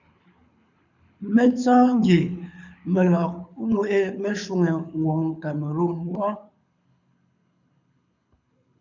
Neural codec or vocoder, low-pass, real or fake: codec, 24 kHz, 6 kbps, HILCodec; 7.2 kHz; fake